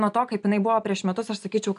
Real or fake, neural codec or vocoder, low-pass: real; none; 10.8 kHz